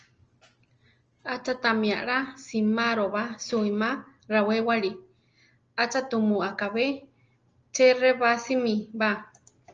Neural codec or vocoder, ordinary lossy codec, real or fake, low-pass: none; Opus, 24 kbps; real; 7.2 kHz